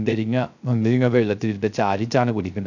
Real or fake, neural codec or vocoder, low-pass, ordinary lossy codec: fake; codec, 16 kHz, 0.3 kbps, FocalCodec; 7.2 kHz; none